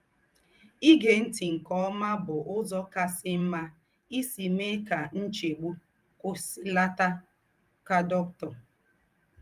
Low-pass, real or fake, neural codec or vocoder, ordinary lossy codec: 14.4 kHz; fake; vocoder, 44.1 kHz, 128 mel bands every 512 samples, BigVGAN v2; Opus, 32 kbps